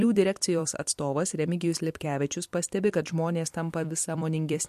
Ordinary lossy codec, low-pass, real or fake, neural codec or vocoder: MP3, 64 kbps; 14.4 kHz; fake; vocoder, 44.1 kHz, 128 mel bands every 256 samples, BigVGAN v2